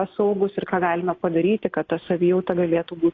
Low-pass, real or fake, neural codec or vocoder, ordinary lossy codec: 7.2 kHz; real; none; AAC, 32 kbps